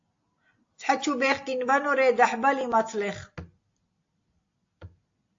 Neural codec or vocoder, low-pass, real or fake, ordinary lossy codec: none; 7.2 kHz; real; AAC, 48 kbps